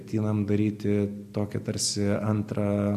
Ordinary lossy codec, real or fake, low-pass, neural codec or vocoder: MP3, 64 kbps; real; 14.4 kHz; none